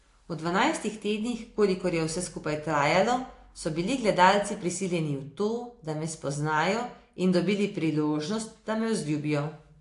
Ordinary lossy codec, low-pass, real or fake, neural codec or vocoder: AAC, 48 kbps; 10.8 kHz; real; none